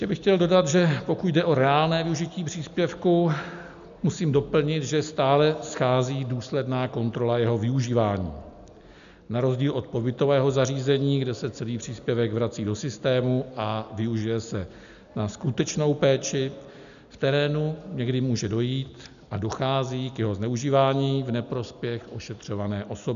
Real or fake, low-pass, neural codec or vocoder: real; 7.2 kHz; none